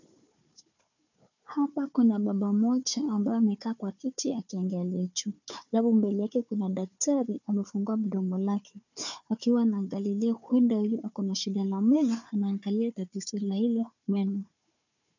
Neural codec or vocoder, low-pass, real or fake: codec, 16 kHz, 4 kbps, FunCodec, trained on Chinese and English, 50 frames a second; 7.2 kHz; fake